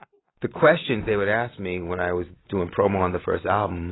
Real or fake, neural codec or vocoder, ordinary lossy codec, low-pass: real; none; AAC, 16 kbps; 7.2 kHz